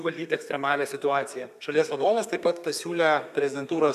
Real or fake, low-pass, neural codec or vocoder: fake; 14.4 kHz; codec, 32 kHz, 1.9 kbps, SNAC